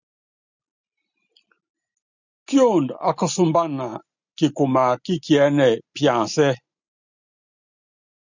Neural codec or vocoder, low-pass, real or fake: none; 7.2 kHz; real